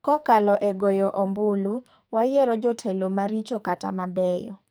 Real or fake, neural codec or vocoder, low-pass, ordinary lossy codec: fake; codec, 44.1 kHz, 2.6 kbps, SNAC; none; none